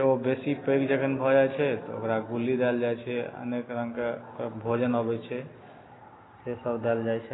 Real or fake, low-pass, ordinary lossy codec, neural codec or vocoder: real; 7.2 kHz; AAC, 16 kbps; none